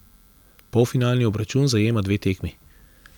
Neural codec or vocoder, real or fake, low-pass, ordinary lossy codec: none; real; 19.8 kHz; none